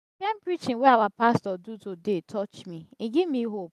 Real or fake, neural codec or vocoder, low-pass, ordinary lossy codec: real; none; 14.4 kHz; none